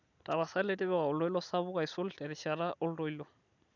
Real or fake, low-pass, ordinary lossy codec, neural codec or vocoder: real; 7.2 kHz; none; none